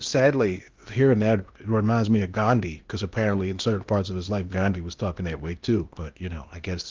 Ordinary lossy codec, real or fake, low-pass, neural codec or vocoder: Opus, 32 kbps; fake; 7.2 kHz; codec, 16 kHz in and 24 kHz out, 0.8 kbps, FocalCodec, streaming, 65536 codes